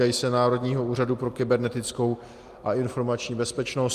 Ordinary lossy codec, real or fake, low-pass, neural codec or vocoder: Opus, 32 kbps; real; 14.4 kHz; none